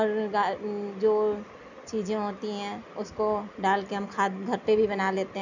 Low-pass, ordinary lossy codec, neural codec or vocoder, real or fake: 7.2 kHz; none; none; real